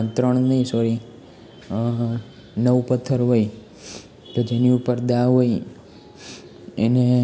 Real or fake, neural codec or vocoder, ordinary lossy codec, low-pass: real; none; none; none